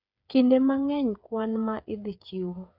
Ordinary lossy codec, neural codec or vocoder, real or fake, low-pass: none; codec, 16 kHz, 8 kbps, FreqCodec, smaller model; fake; 5.4 kHz